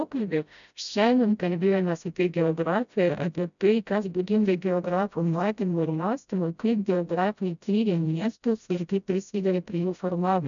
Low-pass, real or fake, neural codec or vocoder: 7.2 kHz; fake; codec, 16 kHz, 0.5 kbps, FreqCodec, smaller model